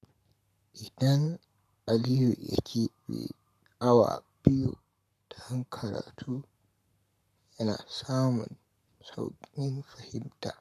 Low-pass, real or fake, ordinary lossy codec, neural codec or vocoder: 14.4 kHz; fake; none; codec, 44.1 kHz, 7.8 kbps, Pupu-Codec